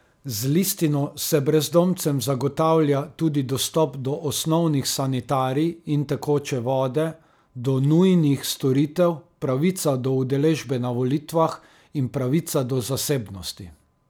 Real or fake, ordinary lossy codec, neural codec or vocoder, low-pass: real; none; none; none